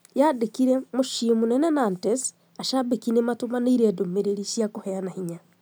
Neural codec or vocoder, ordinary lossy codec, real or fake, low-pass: none; none; real; none